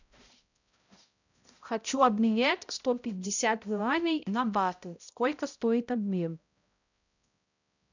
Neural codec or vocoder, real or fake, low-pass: codec, 16 kHz, 0.5 kbps, X-Codec, HuBERT features, trained on balanced general audio; fake; 7.2 kHz